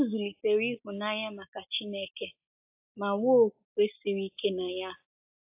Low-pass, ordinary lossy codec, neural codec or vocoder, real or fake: 3.6 kHz; AAC, 32 kbps; none; real